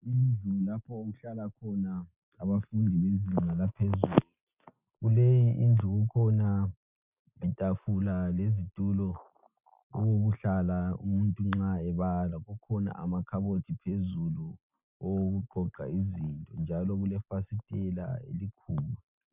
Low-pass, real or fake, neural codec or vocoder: 3.6 kHz; real; none